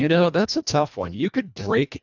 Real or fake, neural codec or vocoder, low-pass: fake; codec, 24 kHz, 1.5 kbps, HILCodec; 7.2 kHz